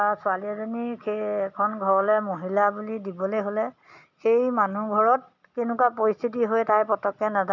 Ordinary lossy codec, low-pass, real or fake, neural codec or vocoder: none; 7.2 kHz; real; none